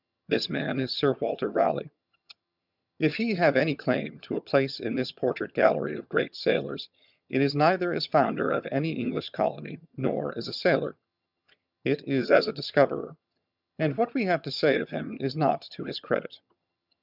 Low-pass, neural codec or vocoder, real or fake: 5.4 kHz; vocoder, 22.05 kHz, 80 mel bands, HiFi-GAN; fake